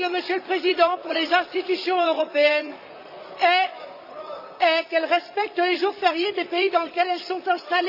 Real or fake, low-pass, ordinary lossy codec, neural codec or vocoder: fake; 5.4 kHz; none; vocoder, 44.1 kHz, 128 mel bands, Pupu-Vocoder